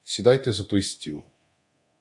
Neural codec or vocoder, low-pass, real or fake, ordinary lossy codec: codec, 24 kHz, 0.9 kbps, DualCodec; 10.8 kHz; fake; AAC, 64 kbps